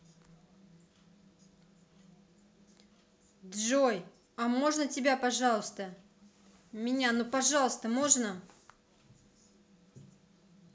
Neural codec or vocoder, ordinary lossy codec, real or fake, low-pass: none; none; real; none